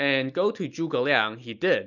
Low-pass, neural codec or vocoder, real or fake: 7.2 kHz; none; real